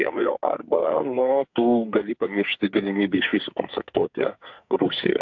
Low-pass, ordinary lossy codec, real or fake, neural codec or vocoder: 7.2 kHz; AAC, 48 kbps; fake; codec, 44.1 kHz, 2.6 kbps, SNAC